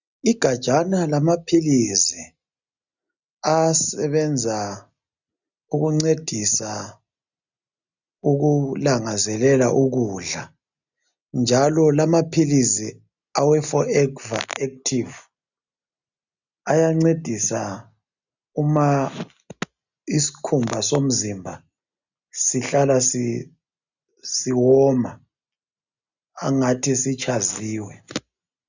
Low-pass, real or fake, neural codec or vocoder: 7.2 kHz; real; none